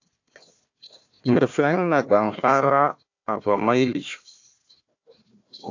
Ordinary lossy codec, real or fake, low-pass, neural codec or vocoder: AAC, 48 kbps; fake; 7.2 kHz; codec, 16 kHz, 1 kbps, FunCodec, trained on Chinese and English, 50 frames a second